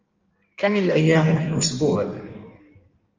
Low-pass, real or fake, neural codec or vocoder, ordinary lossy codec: 7.2 kHz; fake; codec, 16 kHz in and 24 kHz out, 1.1 kbps, FireRedTTS-2 codec; Opus, 32 kbps